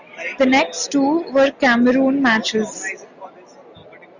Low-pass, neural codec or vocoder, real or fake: 7.2 kHz; none; real